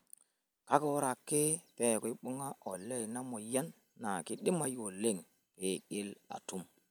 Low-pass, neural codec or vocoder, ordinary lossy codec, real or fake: none; none; none; real